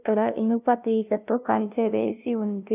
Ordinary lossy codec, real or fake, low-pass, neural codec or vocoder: none; fake; 3.6 kHz; codec, 16 kHz, 0.5 kbps, FunCodec, trained on LibriTTS, 25 frames a second